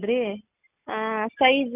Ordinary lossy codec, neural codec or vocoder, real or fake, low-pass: none; none; real; 3.6 kHz